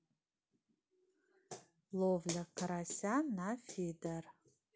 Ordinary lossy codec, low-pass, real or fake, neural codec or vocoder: none; none; real; none